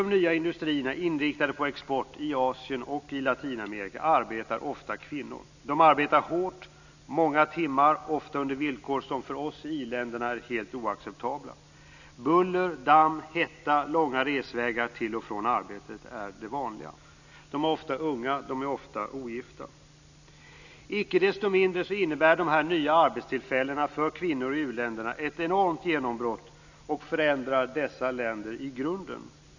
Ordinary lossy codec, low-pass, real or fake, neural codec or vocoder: none; 7.2 kHz; real; none